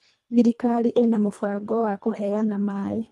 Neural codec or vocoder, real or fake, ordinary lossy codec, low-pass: codec, 24 kHz, 1.5 kbps, HILCodec; fake; none; none